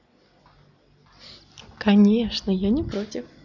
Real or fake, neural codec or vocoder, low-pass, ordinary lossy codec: real; none; 7.2 kHz; none